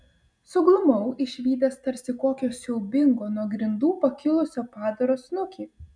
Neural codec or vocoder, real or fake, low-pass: none; real; 9.9 kHz